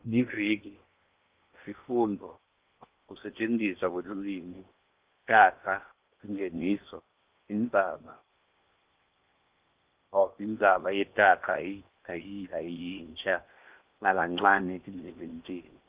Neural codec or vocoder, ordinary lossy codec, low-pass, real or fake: codec, 16 kHz in and 24 kHz out, 0.8 kbps, FocalCodec, streaming, 65536 codes; Opus, 32 kbps; 3.6 kHz; fake